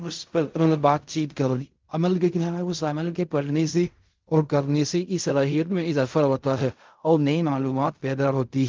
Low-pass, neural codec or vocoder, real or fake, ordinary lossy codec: 7.2 kHz; codec, 16 kHz in and 24 kHz out, 0.4 kbps, LongCat-Audio-Codec, fine tuned four codebook decoder; fake; Opus, 32 kbps